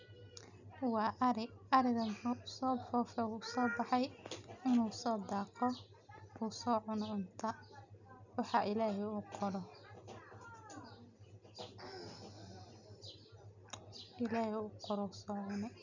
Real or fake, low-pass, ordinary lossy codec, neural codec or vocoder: real; 7.2 kHz; none; none